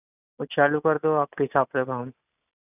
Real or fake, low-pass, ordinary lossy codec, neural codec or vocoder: real; 3.6 kHz; none; none